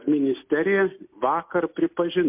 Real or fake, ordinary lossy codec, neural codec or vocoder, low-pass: real; MP3, 32 kbps; none; 3.6 kHz